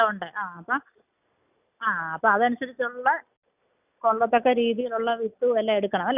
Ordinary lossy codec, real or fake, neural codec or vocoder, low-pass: none; real; none; 3.6 kHz